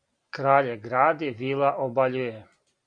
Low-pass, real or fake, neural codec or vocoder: 9.9 kHz; real; none